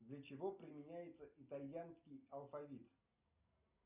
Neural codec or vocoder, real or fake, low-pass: none; real; 3.6 kHz